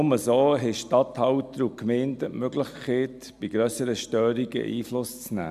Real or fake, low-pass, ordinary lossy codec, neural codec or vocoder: real; 14.4 kHz; MP3, 96 kbps; none